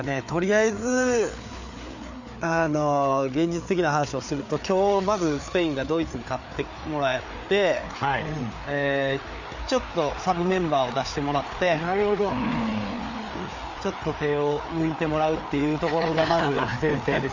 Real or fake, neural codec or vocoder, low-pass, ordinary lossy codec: fake; codec, 16 kHz, 4 kbps, FreqCodec, larger model; 7.2 kHz; none